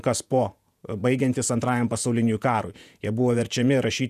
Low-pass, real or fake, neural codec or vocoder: 14.4 kHz; fake; vocoder, 48 kHz, 128 mel bands, Vocos